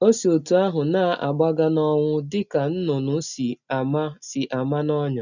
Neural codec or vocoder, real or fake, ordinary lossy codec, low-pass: none; real; none; 7.2 kHz